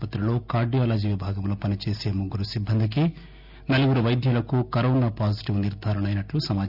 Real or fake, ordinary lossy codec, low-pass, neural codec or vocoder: real; none; 5.4 kHz; none